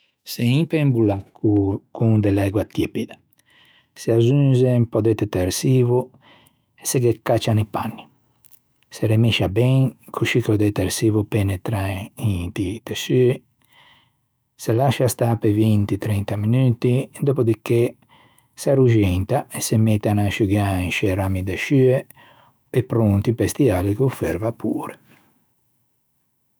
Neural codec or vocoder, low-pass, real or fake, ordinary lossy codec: autoencoder, 48 kHz, 128 numbers a frame, DAC-VAE, trained on Japanese speech; none; fake; none